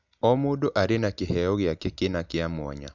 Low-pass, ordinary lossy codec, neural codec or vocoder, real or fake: 7.2 kHz; none; none; real